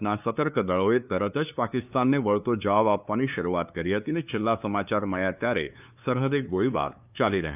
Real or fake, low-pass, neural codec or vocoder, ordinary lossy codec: fake; 3.6 kHz; codec, 16 kHz, 2 kbps, FunCodec, trained on LibriTTS, 25 frames a second; none